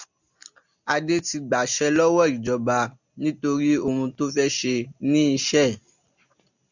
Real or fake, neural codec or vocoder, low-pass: real; none; 7.2 kHz